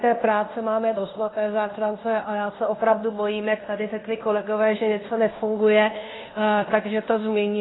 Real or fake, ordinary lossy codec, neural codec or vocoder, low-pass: fake; AAC, 16 kbps; codec, 16 kHz in and 24 kHz out, 0.9 kbps, LongCat-Audio-Codec, fine tuned four codebook decoder; 7.2 kHz